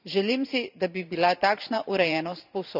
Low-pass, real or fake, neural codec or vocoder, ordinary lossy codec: 5.4 kHz; fake; vocoder, 44.1 kHz, 128 mel bands every 512 samples, BigVGAN v2; none